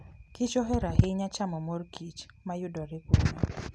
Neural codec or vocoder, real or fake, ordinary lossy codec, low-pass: none; real; none; none